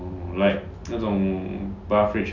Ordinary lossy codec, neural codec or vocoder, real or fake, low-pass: none; none; real; 7.2 kHz